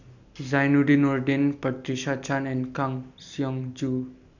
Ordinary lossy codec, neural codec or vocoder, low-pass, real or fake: none; none; 7.2 kHz; real